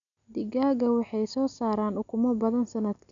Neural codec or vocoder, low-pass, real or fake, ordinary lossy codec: none; 7.2 kHz; real; none